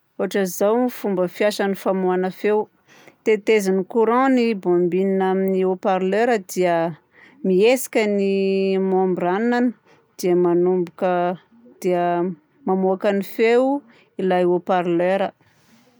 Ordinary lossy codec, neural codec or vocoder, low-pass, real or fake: none; none; none; real